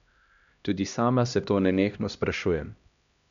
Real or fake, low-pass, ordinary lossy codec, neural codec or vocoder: fake; 7.2 kHz; none; codec, 16 kHz, 1 kbps, X-Codec, HuBERT features, trained on LibriSpeech